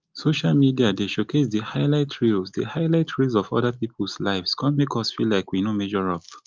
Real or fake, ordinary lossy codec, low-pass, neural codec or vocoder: real; Opus, 32 kbps; 7.2 kHz; none